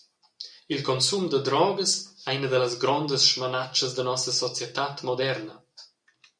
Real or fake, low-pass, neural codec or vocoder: real; 9.9 kHz; none